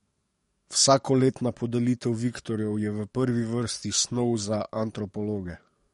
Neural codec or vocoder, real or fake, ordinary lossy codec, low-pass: codec, 44.1 kHz, 7.8 kbps, DAC; fake; MP3, 48 kbps; 19.8 kHz